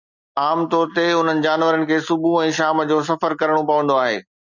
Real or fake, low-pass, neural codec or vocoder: real; 7.2 kHz; none